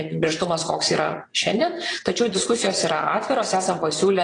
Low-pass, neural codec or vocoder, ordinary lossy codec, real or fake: 9.9 kHz; vocoder, 22.05 kHz, 80 mel bands, WaveNeXt; AAC, 32 kbps; fake